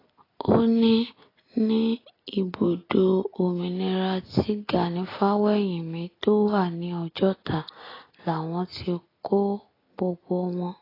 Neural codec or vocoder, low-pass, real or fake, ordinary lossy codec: none; 5.4 kHz; real; AAC, 24 kbps